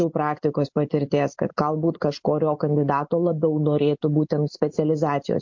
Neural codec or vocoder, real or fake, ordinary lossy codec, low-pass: none; real; MP3, 48 kbps; 7.2 kHz